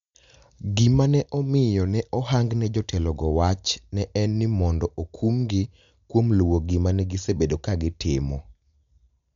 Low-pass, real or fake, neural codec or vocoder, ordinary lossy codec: 7.2 kHz; real; none; MP3, 64 kbps